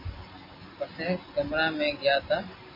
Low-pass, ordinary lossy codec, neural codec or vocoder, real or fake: 5.4 kHz; MP3, 24 kbps; none; real